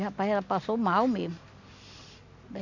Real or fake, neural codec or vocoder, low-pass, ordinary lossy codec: real; none; 7.2 kHz; none